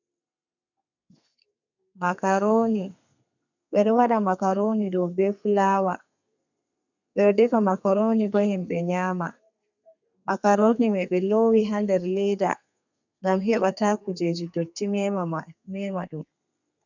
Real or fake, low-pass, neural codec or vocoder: fake; 7.2 kHz; codec, 32 kHz, 1.9 kbps, SNAC